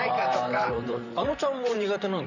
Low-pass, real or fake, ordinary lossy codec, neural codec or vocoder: 7.2 kHz; fake; none; vocoder, 44.1 kHz, 128 mel bands, Pupu-Vocoder